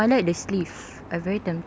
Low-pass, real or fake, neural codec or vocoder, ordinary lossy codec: none; real; none; none